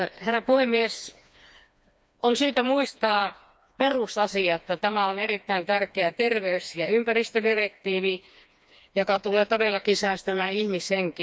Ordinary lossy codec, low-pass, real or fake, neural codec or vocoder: none; none; fake; codec, 16 kHz, 2 kbps, FreqCodec, smaller model